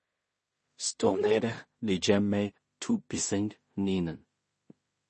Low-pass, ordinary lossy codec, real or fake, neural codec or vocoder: 10.8 kHz; MP3, 32 kbps; fake; codec, 16 kHz in and 24 kHz out, 0.4 kbps, LongCat-Audio-Codec, two codebook decoder